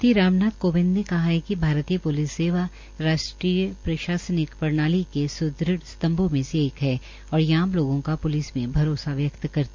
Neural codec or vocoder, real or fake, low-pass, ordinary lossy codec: none; real; 7.2 kHz; MP3, 48 kbps